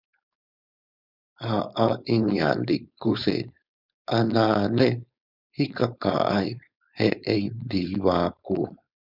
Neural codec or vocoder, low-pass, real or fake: codec, 16 kHz, 4.8 kbps, FACodec; 5.4 kHz; fake